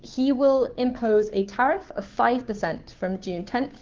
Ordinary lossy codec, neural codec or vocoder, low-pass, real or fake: Opus, 16 kbps; codec, 16 kHz, 2 kbps, FunCodec, trained on Chinese and English, 25 frames a second; 7.2 kHz; fake